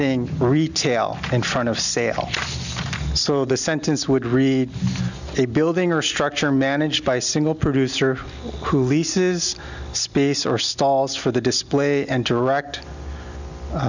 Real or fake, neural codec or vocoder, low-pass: real; none; 7.2 kHz